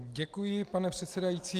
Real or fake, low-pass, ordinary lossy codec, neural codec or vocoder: real; 14.4 kHz; Opus, 32 kbps; none